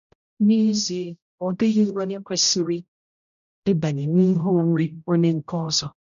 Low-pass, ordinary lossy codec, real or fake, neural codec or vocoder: 7.2 kHz; none; fake; codec, 16 kHz, 0.5 kbps, X-Codec, HuBERT features, trained on general audio